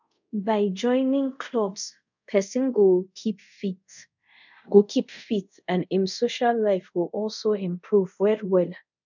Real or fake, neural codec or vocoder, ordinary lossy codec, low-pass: fake; codec, 24 kHz, 0.5 kbps, DualCodec; none; 7.2 kHz